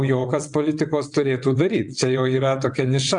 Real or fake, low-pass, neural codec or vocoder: fake; 9.9 kHz; vocoder, 22.05 kHz, 80 mel bands, WaveNeXt